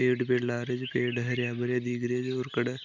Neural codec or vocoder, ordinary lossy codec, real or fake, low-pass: none; MP3, 64 kbps; real; 7.2 kHz